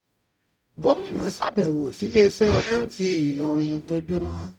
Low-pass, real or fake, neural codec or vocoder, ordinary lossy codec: 19.8 kHz; fake; codec, 44.1 kHz, 0.9 kbps, DAC; none